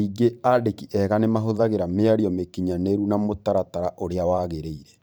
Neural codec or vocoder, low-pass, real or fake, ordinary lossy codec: vocoder, 44.1 kHz, 128 mel bands every 512 samples, BigVGAN v2; none; fake; none